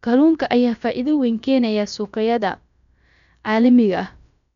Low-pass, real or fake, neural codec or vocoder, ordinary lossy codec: 7.2 kHz; fake; codec, 16 kHz, about 1 kbps, DyCAST, with the encoder's durations; none